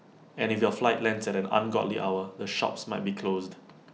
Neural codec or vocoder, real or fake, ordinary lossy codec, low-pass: none; real; none; none